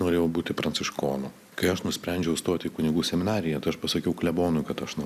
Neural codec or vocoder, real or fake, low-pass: none; real; 14.4 kHz